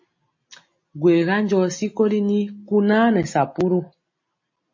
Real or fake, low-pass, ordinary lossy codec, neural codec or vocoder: real; 7.2 kHz; MP3, 32 kbps; none